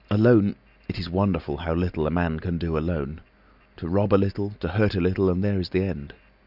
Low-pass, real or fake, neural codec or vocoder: 5.4 kHz; real; none